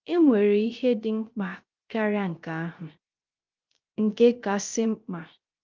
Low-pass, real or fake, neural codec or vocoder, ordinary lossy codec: 7.2 kHz; fake; codec, 16 kHz, 0.3 kbps, FocalCodec; Opus, 24 kbps